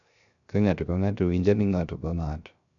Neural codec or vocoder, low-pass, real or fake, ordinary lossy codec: codec, 16 kHz, 0.3 kbps, FocalCodec; 7.2 kHz; fake; none